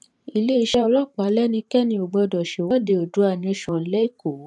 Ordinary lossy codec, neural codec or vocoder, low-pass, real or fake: none; vocoder, 44.1 kHz, 128 mel bands every 512 samples, BigVGAN v2; 10.8 kHz; fake